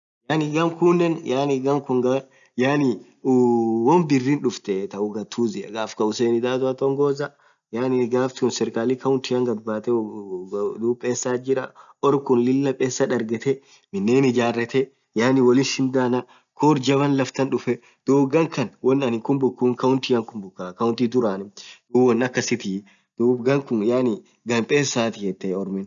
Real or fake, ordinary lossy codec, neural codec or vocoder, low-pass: real; none; none; 7.2 kHz